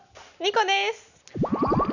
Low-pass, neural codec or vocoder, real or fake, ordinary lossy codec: 7.2 kHz; none; real; none